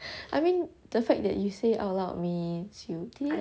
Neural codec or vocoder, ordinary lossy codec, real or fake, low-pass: none; none; real; none